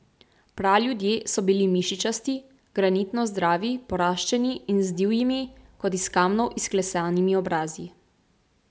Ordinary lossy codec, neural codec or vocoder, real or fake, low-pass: none; none; real; none